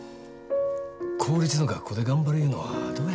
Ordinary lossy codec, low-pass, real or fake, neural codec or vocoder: none; none; real; none